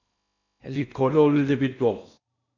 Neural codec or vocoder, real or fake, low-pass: codec, 16 kHz in and 24 kHz out, 0.6 kbps, FocalCodec, streaming, 2048 codes; fake; 7.2 kHz